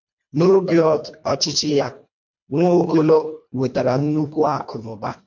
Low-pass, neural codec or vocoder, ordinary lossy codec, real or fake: 7.2 kHz; codec, 24 kHz, 1.5 kbps, HILCodec; MP3, 48 kbps; fake